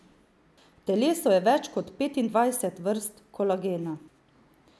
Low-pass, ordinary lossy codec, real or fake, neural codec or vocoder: none; none; real; none